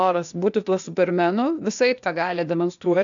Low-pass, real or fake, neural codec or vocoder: 7.2 kHz; fake; codec, 16 kHz, 0.8 kbps, ZipCodec